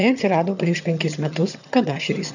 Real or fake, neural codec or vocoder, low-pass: fake; vocoder, 22.05 kHz, 80 mel bands, HiFi-GAN; 7.2 kHz